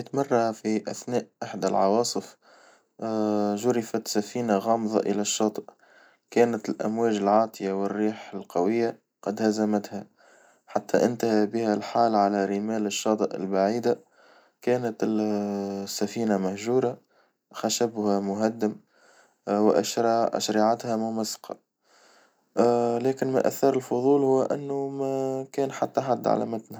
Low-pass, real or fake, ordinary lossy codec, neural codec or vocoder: none; real; none; none